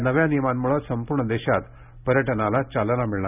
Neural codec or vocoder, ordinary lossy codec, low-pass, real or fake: none; none; 3.6 kHz; real